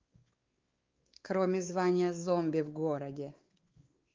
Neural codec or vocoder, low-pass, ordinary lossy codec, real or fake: codec, 16 kHz, 4 kbps, X-Codec, WavLM features, trained on Multilingual LibriSpeech; 7.2 kHz; Opus, 32 kbps; fake